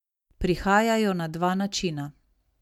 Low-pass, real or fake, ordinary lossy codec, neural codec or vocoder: 19.8 kHz; real; MP3, 96 kbps; none